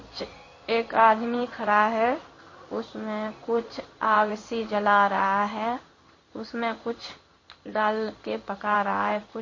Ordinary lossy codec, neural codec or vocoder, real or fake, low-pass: MP3, 32 kbps; codec, 16 kHz in and 24 kHz out, 1 kbps, XY-Tokenizer; fake; 7.2 kHz